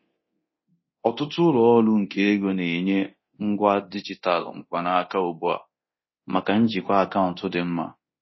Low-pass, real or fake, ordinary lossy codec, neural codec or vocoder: 7.2 kHz; fake; MP3, 24 kbps; codec, 24 kHz, 0.9 kbps, DualCodec